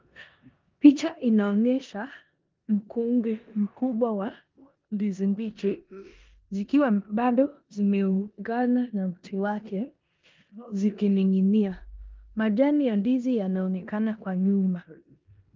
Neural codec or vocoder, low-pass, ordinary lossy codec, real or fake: codec, 16 kHz in and 24 kHz out, 0.9 kbps, LongCat-Audio-Codec, four codebook decoder; 7.2 kHz; Opus, 32 kbps; fake